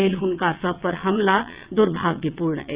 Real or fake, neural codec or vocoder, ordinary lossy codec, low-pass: fake; vocoder, 22.05 kHz, 80 mel bands, WaveNeXt; Opus, 64 kbps; 3.6 kHz